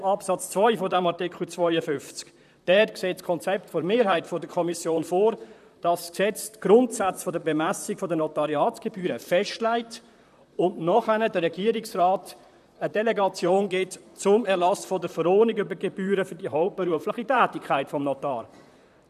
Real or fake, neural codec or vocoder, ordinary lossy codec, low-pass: fake; vocoder, 44.1 kHz, 128 mel bands, Pupu-Vocoder; none; 14.4 kHz